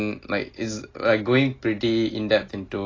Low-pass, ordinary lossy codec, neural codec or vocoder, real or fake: 7.2 kHz; AAC, 32 kbps; none; real